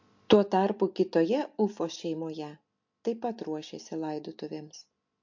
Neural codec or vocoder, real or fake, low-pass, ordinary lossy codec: none; real; 7.2 kHz; MP3, 48 kbps